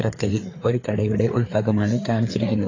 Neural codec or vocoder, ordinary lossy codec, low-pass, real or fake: codec, 16 kHz, 4 kbps, FreqCodec, larger model; AAC, 32 kbps; 7.2 kHz; fake